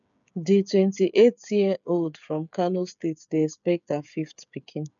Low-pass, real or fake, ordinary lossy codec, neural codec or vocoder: 7.2 kHz; fake; none; codec, 16 kHz, 8 kbps, FreqCodec, smaller model